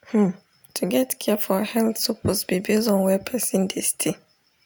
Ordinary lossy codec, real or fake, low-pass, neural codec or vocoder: none; real; none; none